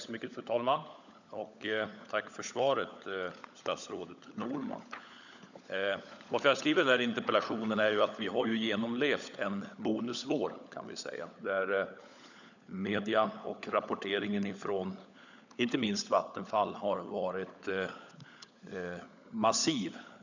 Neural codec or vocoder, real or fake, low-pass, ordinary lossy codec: codec, 16 kHz, 16 kbps, FunCodec, trained on LibriTTS, 50 frames a second; fake; 7.2 kHz; none